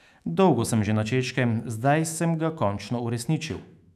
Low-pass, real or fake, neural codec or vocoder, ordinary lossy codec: 14.4 kHz; fake; autoencoder, 48 kHz, 128 numbers a frame, DAC-VAE, trained on Japanese speech; none